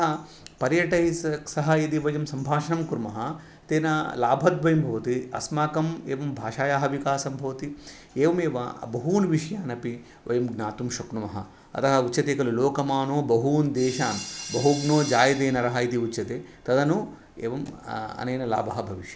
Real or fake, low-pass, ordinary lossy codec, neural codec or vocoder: real; none; none; none